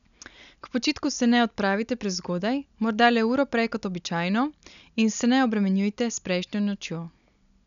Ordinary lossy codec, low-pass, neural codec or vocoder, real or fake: none; 7.2 kHz; none; real